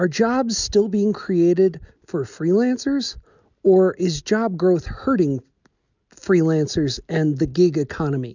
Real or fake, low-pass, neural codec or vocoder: real; 7.2 kHz; none